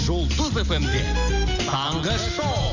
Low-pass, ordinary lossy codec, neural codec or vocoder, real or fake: 7.2 kHz; none; none; real